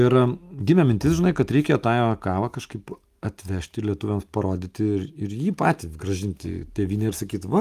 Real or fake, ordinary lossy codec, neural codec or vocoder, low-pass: real; Opus, 32 kbps; none; 14.4 kHz